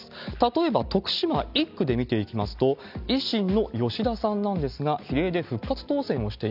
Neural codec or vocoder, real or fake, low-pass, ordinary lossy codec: none; real; 5.4 kHz; none